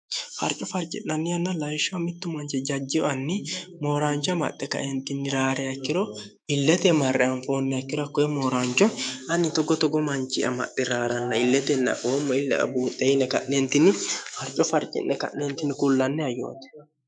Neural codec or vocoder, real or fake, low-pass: autoencoder, 48 kHz, 128 numbers a frame, DAC-VAE, trained on Japanese speech; fake; 9.9 kHz